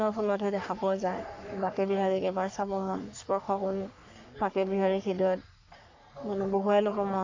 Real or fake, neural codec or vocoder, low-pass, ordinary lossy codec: fake; codec, 44.1 kHz, 3.4 kbps, Pupu-Codec; 7.2 kHz; AAC, 48 kbps